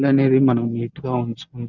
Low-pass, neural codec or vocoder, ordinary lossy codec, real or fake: 7.2 kHz; none; none; real